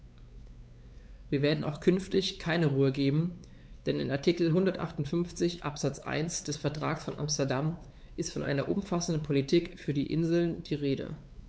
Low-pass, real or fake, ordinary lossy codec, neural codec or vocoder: none; fake; none; codec, 16 kHz, 4 kbps, X-Codec, WavLM features, trained on Multilingual LibriSpeech